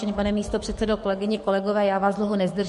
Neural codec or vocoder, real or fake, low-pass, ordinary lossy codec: codec, 44.1 kHz, 7.8 kbps, DAC; fake; 14.4 kHz; MP3, 48 kbps